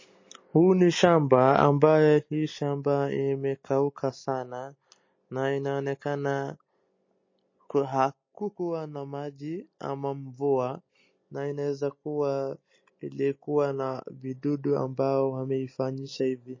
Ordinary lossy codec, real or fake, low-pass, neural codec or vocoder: MP3, 32 kbps; real; 7.2 kHz; none